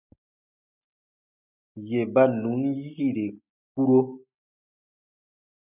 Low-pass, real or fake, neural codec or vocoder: 3.6 kHz; real; none